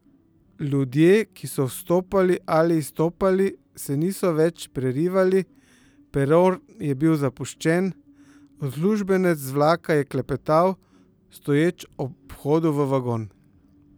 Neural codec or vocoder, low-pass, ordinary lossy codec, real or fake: none; none; none; real